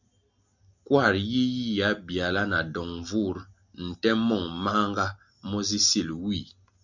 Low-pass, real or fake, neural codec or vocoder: 7.2 kHz; real; none